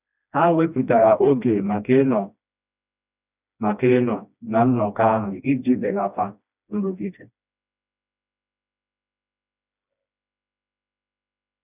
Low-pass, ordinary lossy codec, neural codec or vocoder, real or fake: 3.6 kHz; none; codec, 16 kHz, 1 kbps, FreqCodec, smaller model; fake